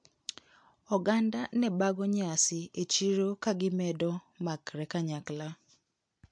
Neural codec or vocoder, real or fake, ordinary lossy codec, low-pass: none; real; MP3, 64 kbps; 9.9 kHz